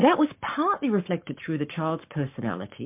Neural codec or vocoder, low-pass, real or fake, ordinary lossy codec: codec, 44.1 kHz, 7.8 kbps, Pupu-Codec; 3.6 kHz; fake; MP3, 32 kbps